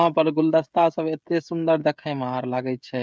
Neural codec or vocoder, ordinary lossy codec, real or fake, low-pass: codec, 16 kHz, 16 kbps, FreqCodec, smaller model; none; fake; none